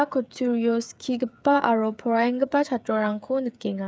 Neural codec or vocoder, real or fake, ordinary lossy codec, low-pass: codec, 16 kHz, 8 kbps, FreqCodec, smaller model; fake; none; none